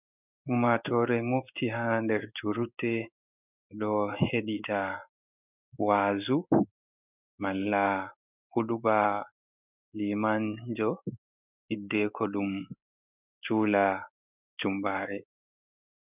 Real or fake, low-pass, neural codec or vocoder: fake; 3.6 kHz; codec, 16 kHz in and 24 kHz out, 1 kbps, XY-Tokenizer